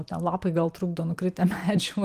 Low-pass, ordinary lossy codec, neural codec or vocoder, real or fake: 14.4 kHz; Opus, 24 kbps; vocoder, 44.1 kHz, 128 mel bands every 256 samples, BigVGAN v2; fake